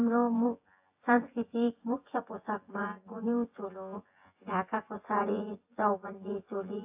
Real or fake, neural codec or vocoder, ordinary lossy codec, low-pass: fake; vocoder, 24 kHz, 100 mel bands, Vocos; AAC, 32 kbps; 3.6 kHz